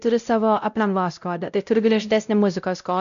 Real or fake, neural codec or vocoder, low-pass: fake; codec, 16 kHz, 0.5 kbps, X-Codec, WavLM features, trained on Multilingual LibriSpeech; 7.2 kHz